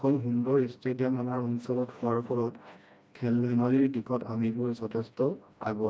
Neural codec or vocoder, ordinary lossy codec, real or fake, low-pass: codec, 16 kHz, 1 kbps, FreqCodec, smaller model; none; fake; none